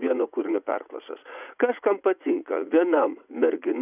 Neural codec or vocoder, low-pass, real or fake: vocoder, 44.1 kHz, 80 mel bands, Vocos; 3.6 kHz; fake